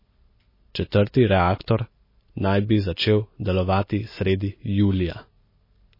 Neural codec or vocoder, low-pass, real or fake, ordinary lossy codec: none; 5.4 kHz; real; MP3, 24 kbps